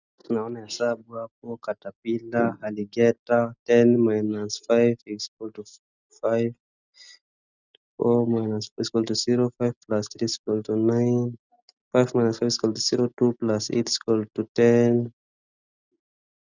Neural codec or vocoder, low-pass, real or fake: none; 7.2 kHz; real